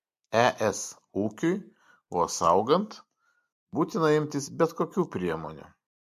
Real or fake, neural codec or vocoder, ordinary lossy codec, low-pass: real; none; MP3, 64 kbps; 14.4 kHz